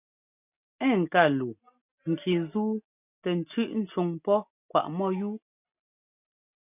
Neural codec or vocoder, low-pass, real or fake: none; 3.6 kHz; real